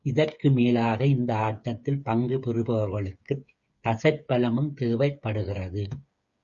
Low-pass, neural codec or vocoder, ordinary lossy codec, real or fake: 7.2 kHz; codec, 16 kHz, 8 kbps, FreqCodec, smaller model; Opus, 64 kbps; fake